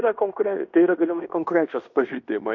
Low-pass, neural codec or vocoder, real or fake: 7.2 kHz; codec, 16 kHz in and 24 kHz out, 0.9 kbps, LongCat-Audio-Codec, fine tuned four codebook decoder; fake